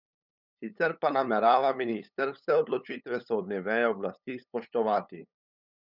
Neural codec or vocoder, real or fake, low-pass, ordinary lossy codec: codec, 16 kHz, 8 kbps, FunCodec, trained on LibriTTS, 25 frames a second; fake; 5.4 kHz; none